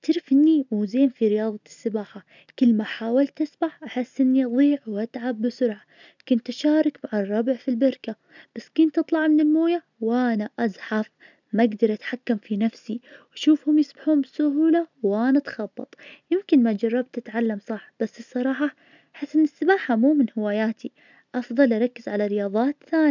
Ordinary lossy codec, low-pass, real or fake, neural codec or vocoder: none; 7.2 kHz; real; none